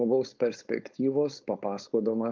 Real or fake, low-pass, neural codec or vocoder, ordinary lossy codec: fake; 7.2 kHz; codec, 16 kHz, 4.8 kbps, FACodec; Opus, 24 kbps